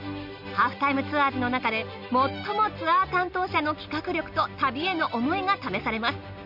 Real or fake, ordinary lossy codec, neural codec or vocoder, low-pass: real; none; none; 5.4 kHz